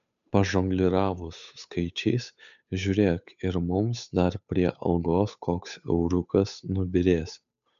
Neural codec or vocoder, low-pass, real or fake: codec, 16 kHz, 8 kbps, FunCodec, trained on Chinese and English, 25 frames a second; 7.2 kHz; fake